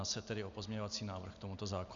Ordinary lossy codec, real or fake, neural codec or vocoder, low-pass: AAC, 64 kbps; real; none; 7.2 kHz